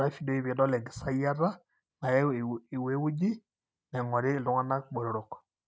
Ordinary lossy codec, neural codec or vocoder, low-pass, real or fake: none; none; none; real